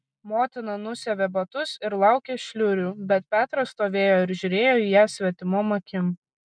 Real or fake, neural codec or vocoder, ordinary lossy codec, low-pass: real; none; MP3, 96 kbps; 9.9 kHz